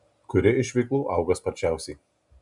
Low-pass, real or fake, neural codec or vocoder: 10.8 kHz; real; none